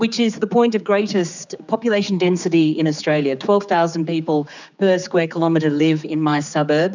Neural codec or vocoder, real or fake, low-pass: codec, 16 kHz, 4 kbps, X-Codec, HuBERT features, trained on general audio; fake; 7.2 kHz